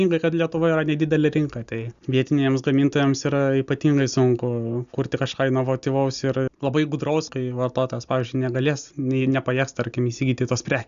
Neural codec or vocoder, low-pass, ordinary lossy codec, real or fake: none; 7.2 kHz; Opus, 64 kbps; real